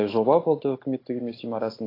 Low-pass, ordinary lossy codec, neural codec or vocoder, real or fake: 5.4 kHz; AAC, 24 kbps; none; real